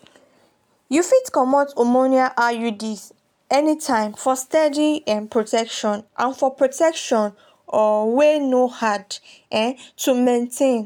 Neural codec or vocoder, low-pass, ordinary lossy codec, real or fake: none; 19.8 kHz; none; real